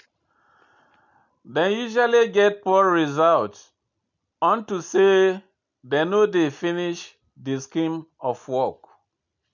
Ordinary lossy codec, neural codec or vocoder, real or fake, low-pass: none; none; real; 7.2 kHz